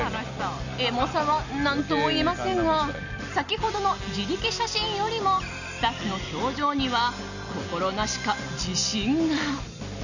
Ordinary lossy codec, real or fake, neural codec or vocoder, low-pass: none; real; none; 7.2 kHz